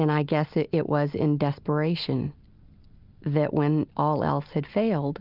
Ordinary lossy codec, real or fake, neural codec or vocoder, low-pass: Opus, 24 kbps; real; none; 5.4 kHz